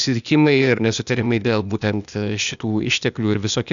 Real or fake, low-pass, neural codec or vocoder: fake; 7.2 kHz; codec, 16 kHz, 0.8 kbps, ZipCodec